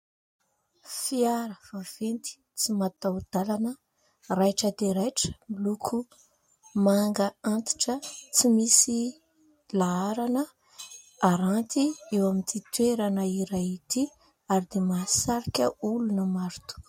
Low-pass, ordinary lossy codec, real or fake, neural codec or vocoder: 19.8 kHz; MP3, 64 kbps; real; none